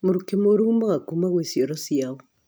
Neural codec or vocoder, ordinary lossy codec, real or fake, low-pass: none; none; real; none